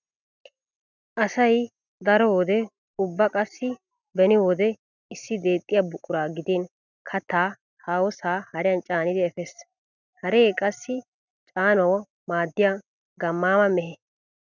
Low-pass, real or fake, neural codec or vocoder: 7.2 kHz; real; none